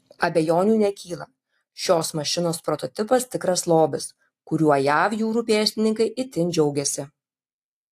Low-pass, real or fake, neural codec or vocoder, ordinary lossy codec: 14.4 kHz; real; none; AAC, 64 kbps